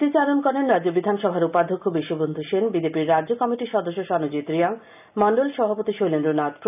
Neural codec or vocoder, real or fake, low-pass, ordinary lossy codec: none; real; 3.6 kHz; none